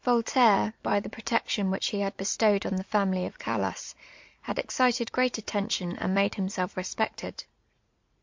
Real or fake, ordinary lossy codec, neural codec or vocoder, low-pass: fake; MP3, 48 kbps; vocoder, 44.1 kHz, 80 mel bands, Vocos; 7.2 kHz